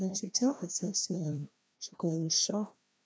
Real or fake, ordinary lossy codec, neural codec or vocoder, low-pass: fake; none; codec, 16 kHz, 1 kbps, FreqCodec, larger model; none